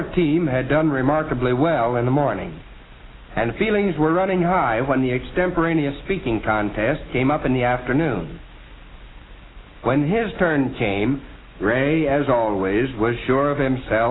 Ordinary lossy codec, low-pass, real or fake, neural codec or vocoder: AAC, 16 kbps; 7.2 kHz; real; none